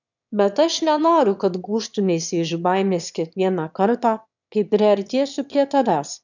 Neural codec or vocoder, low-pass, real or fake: autoencoder, 22.05 kHz, a latent of 192 numbers a frame, VITS, trained on one speaker; 7.2 kHz; fake